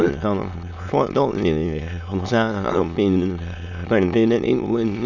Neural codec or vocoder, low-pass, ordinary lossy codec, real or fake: autoencoder, 22.05 kHz, a latent of 192 numbers a frame, VITS, trained on many speakers; 7.2 kHz; none; fake